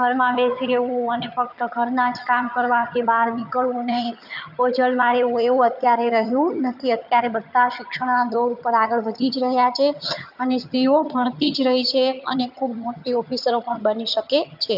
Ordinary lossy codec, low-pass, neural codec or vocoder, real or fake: none; 5.4 kHz; vocoder, 22.05 kHz, 80 mel bands, HiFi-GAN; fake